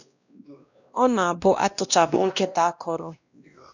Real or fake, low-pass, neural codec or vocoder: fake; 7.2 kHz; codec, 16 kHz, 1 kbps, X-Codec, WavLM features, trained on Multilingual LibriSpeech